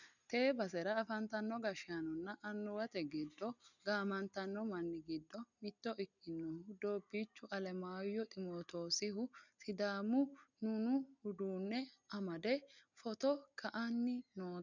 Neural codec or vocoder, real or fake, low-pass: none; real; 7.2 kHz